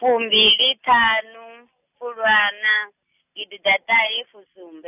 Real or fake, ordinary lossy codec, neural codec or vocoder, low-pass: real; none; none; 3.6 kHz